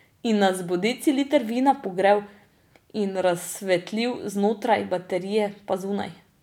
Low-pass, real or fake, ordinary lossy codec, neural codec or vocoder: 19.8 kHz; real; none; none